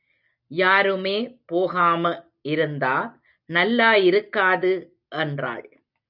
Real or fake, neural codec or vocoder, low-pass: real; none; 5.4 kHz